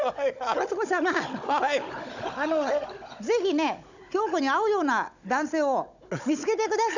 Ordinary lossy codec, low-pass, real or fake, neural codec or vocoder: none; 7.2 kHz; fake; codec, 16 kHz, 16 kbps, FunCodec, trained on Chinese and English, 50 frames a second